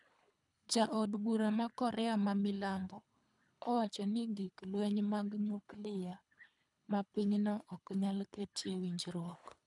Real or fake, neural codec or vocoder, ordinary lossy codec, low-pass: fake; codec, 24 kHz, 3 kbps, HILCodec; none; 10.8 kHz